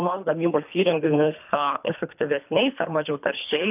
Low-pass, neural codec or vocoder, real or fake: 3.6 kHz; codec, 24 kHz, 3 kbps, HILCodec; fake